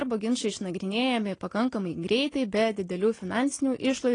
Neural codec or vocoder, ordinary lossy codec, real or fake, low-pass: none; AAC, 32 kbps; real; 9.9 kHz